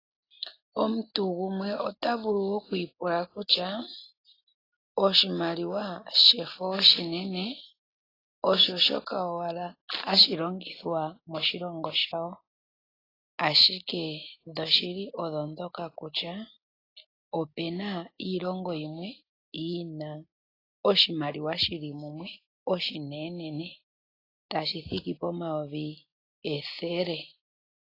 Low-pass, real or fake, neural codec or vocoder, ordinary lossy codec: 5.4 kHz; real; none; AAC, 24 kbps